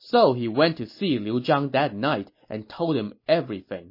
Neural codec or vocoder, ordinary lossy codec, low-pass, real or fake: none; MP3, 24 kbps; 5.4 kHz; real